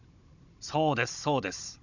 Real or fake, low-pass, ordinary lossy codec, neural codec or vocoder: fake; 7.2 kHz; none; codec, 16 kHz, 16 kbps, FunCodec, trained on Chinese and English, 50 frames a second